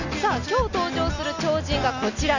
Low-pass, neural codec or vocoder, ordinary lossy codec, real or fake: 7.2 kHz; none; none; real